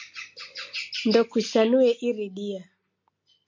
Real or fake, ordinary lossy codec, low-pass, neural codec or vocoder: real; MP3, 48 kbps; 7.2 kHz; none